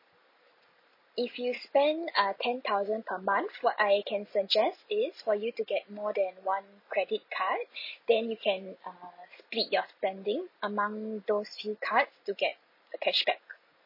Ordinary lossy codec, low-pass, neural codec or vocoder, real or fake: MP3, 24 kbps; 5.4 kHz; none; real